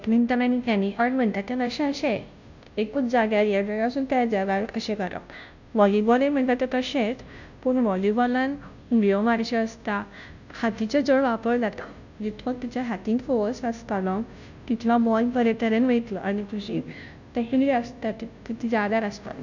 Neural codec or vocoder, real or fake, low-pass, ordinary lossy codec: codec, 16 kHz, 0.5 kbps, FunCodec, trained on Chinese and English, 25 frames a second; fake; 7.2 kHz; none